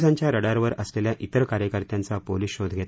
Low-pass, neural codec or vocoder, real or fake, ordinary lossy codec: none; none; real; none